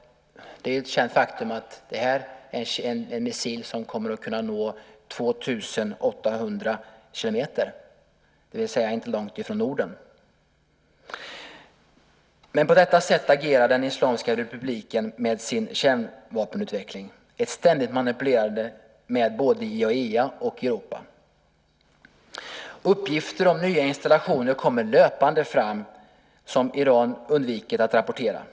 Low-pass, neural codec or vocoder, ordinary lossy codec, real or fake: none; none; none; real